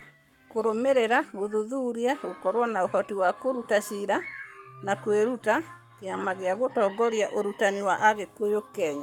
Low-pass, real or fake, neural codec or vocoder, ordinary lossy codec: 19.8 kHz; fake; codec, 44.1 kHz, 7.8 kbps, Pupu-Codec; none